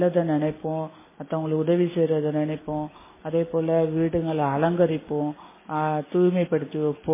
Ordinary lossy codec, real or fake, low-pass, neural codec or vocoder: MP3, 16 kbps; real; 3.6 kHz; none